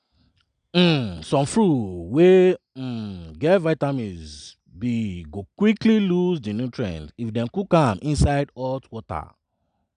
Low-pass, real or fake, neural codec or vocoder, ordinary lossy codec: 9.9 kHz; real; none; none